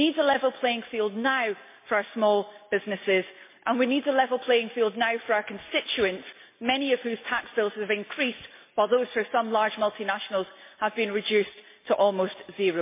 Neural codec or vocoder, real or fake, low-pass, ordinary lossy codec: none; real; 3.6 kHz; MP3, 24 kbps